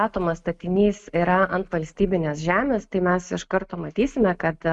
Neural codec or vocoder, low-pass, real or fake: none; 10.8 kHz; real